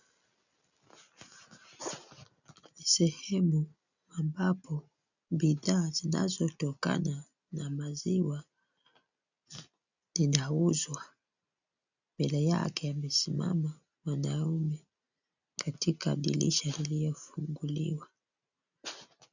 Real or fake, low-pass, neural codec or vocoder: real; 7.2 kHz; none